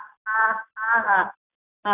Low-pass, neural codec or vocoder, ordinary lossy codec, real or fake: 3.6 kHz; none; none; real